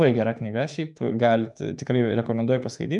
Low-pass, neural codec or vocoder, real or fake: 10.8 kHz; autoencoder, 48 kHz, 32 numbers a frame, DAC-VAE, trained on Japanese speech; fake